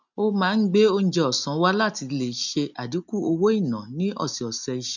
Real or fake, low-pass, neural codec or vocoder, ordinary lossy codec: real; 7.2 kHz; none; none